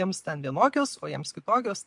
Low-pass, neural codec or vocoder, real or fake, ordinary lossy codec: 10.8 kHz; none; real; MP3, 64 kbps